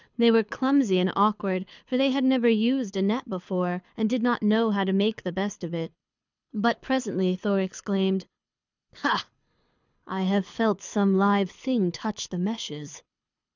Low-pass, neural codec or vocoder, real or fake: 7.2 kHz; codec, 24 kHz, 6 kbps, HILCodec; fake